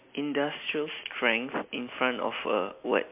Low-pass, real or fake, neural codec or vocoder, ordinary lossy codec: 3.6 kHz; real; none; MP3, 32 kbps